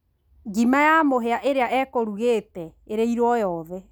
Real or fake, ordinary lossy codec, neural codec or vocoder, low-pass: real; none; none; none